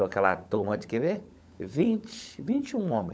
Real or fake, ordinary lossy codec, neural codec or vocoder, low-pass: fake; none; codec, 16 kHz, 16 kbps, FunCodec, trained on LibriTTS, 50 frames a second; none